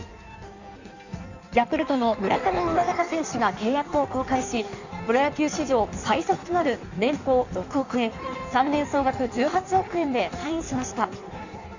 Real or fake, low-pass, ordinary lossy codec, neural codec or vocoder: fake; 7.2 kHz; none; codec, 16 kHz in and 24 kHz out, 1.1 kbps, FireRedTTS-2 codec